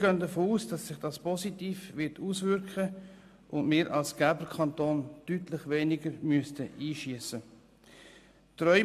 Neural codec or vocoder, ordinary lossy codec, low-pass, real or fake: none; MP3, 96 kbps; 14.4 kHz; real